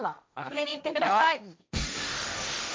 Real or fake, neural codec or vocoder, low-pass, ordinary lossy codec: fake; codec, 16 kHz, 1.1 kbps, Voila-Tokenizer; none; none